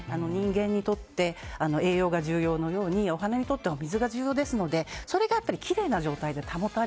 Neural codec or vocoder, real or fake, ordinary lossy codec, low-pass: none; real; none; none